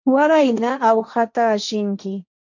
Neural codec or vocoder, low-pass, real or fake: codec, 16 kHz, 1.1 kbps, Voila-Tokenizer; 7.2 kHz; fake